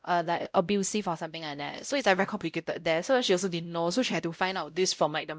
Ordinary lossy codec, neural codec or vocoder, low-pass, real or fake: none; codec, 16 kHz, 0.5 kbps, X-Codec, WavLM features, trained on Multilingual LibriSpeech; none; fake